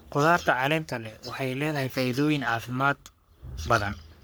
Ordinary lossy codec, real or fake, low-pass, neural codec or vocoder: none; fake; none; codec, 44.1 kHz, 3.4 kbps, Pupu-Codec